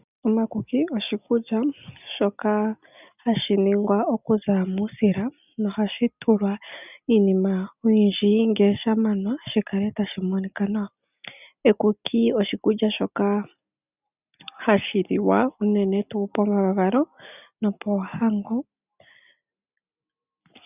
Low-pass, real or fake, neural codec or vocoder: 3.6 kHz; real; none